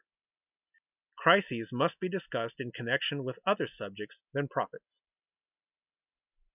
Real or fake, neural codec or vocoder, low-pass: real; none; 3.6 kHz